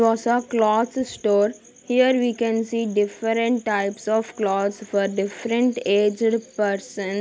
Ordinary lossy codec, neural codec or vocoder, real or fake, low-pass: none; none; real; none